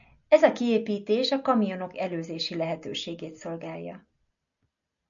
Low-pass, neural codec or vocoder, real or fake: 7.2 kHz; none; real